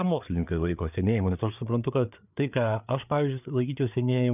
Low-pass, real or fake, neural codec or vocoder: 3.6 kHz; fake; codec, 16 kHz, 4 kbps, FreqCodec, larger model